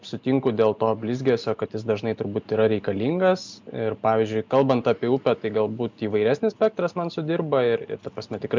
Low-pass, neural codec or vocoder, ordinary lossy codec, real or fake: 7.2 kHz; none; MP3, 64 kbps; real